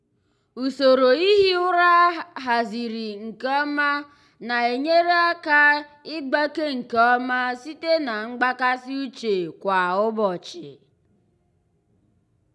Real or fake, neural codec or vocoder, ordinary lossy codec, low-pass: real; none; none; none